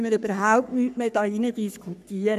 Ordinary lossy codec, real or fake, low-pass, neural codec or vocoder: AAC, 96 kbps; fake; 14.4 kHz; codec, 44.1 kHz, 3.4 kbps, Pupu-Codec